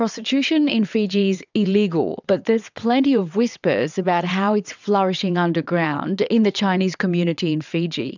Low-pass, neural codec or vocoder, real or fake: 7.2 kHz; vocoder, 22.05 kHz, 80 mel bands, WaveNeXt; fake